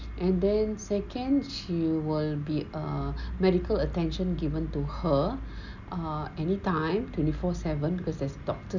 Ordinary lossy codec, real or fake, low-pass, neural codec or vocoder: none; real; 7.2 kHz; none